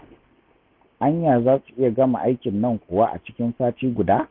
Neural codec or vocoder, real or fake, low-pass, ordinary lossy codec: none; real; 5.4 kHz; none